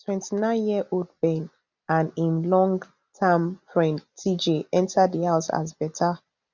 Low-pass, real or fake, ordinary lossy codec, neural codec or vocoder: 7.2 kHz; real; none; none